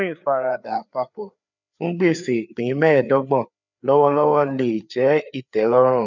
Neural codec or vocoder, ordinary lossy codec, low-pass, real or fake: codec, 16 kHz, 4 kbps, FreqCodec, larger model; none; 7.2 kHz; fake